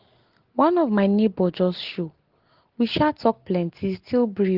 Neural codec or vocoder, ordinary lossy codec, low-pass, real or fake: none; Opus, 16 kbps; 5.4 kHz; real